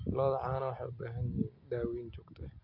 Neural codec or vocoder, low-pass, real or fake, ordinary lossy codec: none; 5.4 kHz; real; none